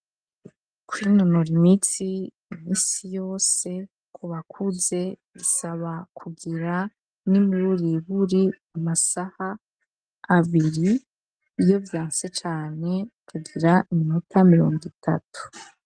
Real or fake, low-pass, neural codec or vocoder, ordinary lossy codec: real; 9.9 kHz; none; Opus, 32 kbps